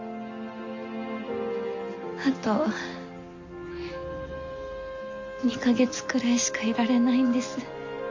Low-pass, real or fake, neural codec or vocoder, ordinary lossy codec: 7.2 kHz; real; none; MP3, 48 kbps